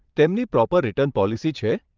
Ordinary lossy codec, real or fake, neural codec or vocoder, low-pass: Opus, 24 kbps; fake; vocoder, 22.05 kHz, 80 mel bands, WaveNeXt; 7.2 kHz